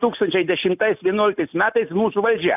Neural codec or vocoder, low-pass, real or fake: none; 3.6 kHz; real